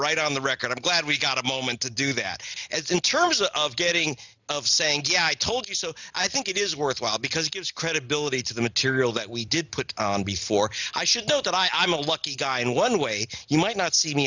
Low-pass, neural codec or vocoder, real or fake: 7.2 kHz; none; real